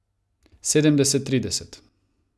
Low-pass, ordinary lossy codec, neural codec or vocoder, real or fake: none; none; none; real